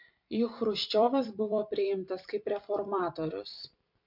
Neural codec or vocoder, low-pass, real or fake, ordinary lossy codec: vocoder, 44.1 kHz, 128 mel bands every 256 samples, BigVGAN v2; 5.4 kHz; fake; MP3, 48 kbps